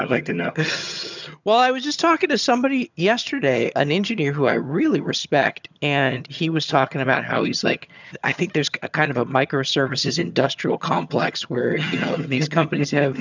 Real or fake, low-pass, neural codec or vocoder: fake; 7.2 kHz; vocoder, 22.05 kHz, 80 mel bands, HiFi-GAN